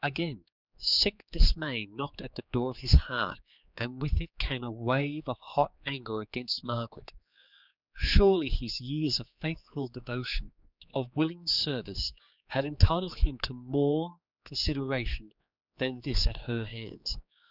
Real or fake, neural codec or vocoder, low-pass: fake; codec, 16 kHz, 4 kbps, X-Codec, HuBERT features, trained on general audio; 5.4 kHz